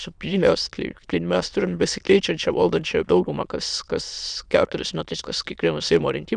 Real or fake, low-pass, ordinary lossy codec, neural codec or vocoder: fake; 9.9 kHz; Opus, 64 kbps; autoencoder, 22.05 kHz, a latent of 192 numbers a frame, VITS, trained on many speakers